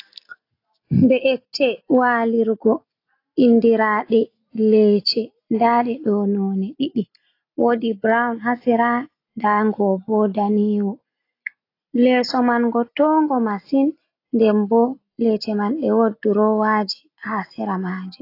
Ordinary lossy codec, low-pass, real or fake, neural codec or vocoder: AAC, 32 kbps; 5.4 kHz; real; none